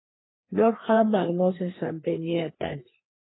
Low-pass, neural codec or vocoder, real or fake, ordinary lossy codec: 7.2 kHz; codec, 16 kHz, 2 kbps, FreqCodec, larger model; fake; AAC, 16 kbps